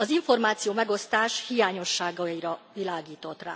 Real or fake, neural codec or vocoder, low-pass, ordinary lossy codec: real; none; none; none